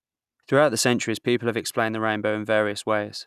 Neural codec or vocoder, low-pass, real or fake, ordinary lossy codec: none; 14.4 kHz; real; none